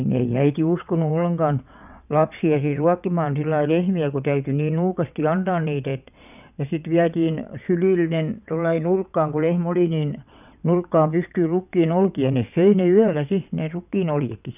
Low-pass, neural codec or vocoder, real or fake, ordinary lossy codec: 3.6 kHz; vocoder, 22.05 kHz, 80 mel bands, WaveNeXt; fake; none